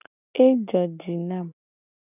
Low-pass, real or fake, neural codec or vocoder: 3.6 kHz; real; none